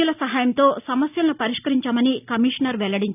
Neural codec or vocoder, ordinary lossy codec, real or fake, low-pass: none; none; real; 3.6 kHz